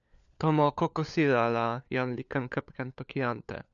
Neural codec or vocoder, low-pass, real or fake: codec, 16 kHz, 4 kbps, FunCodec, trained on LibriTTS, 50 frames a second; 7.2 kHz; fake